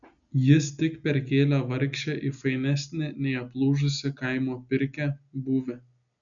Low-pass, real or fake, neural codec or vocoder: 7.2 kHz; real; none